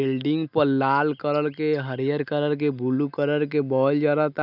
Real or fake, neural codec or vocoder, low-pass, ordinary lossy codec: real; none; 5.4 kHz; none